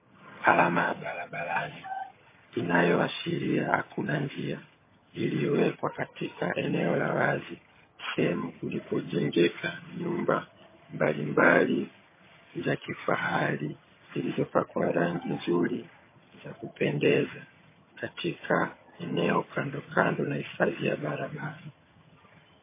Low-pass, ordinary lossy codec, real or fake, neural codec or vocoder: 3.6 kHz; MP3, 16 kbps; fake; vocoder, 22.05 kHz, 80 mel bands, HiFi-GAN